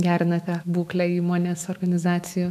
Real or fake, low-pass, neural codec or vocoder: fake; 14.4 kHz; autoencoder, 48 kHz, 128 numbers a frame, DAC-VAE, trained on Japanese speech